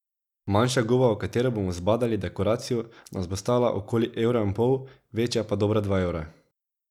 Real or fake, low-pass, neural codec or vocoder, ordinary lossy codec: real; 19.8 kHz; none; none